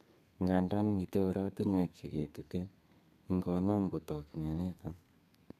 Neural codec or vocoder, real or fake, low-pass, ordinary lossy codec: codec, 32 kHz, 1.9 kbps, SNAC; fake; 14.4 kHz; none